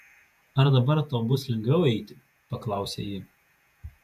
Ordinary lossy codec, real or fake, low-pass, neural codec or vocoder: AAC, 96 kbps; fake; 14.4 kHz; vocoder, 48 kHz, 128 mel bands, Vocos